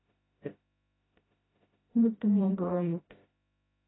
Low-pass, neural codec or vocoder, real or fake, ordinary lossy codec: 7.2 kHz; codec, 16 kHz, 0.5 kbps, FreqCodec, smaller model; fake; AAC, 16 kbps